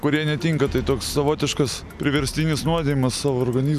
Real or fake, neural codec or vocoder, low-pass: real; none; 14.4 kHz